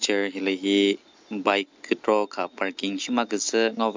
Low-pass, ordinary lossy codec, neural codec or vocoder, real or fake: 7.2 kHz; MP3, 64 kbps; none; real